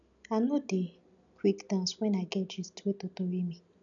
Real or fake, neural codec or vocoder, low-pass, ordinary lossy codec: real; none; 7.2 kHz; none